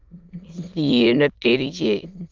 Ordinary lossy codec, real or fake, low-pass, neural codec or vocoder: Opus, 32 kbps; fake; 7.2 kHz; autoencoder, 22.05 kHz, a latent of 192 numbers a frame, VITS, trained on many speakers